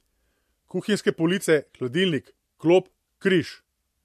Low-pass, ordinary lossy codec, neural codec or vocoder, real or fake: 14.4 kHz; MP3, 64 kbps; none; real